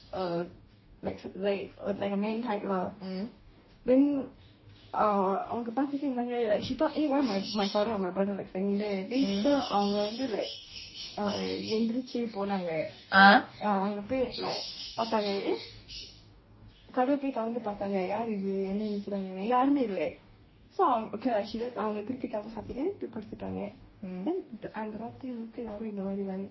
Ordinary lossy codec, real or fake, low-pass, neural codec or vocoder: MP3, 24 kbps; fake; 7.2 kHz; codec, 44.1 kHz, 2.6 kbps, DAC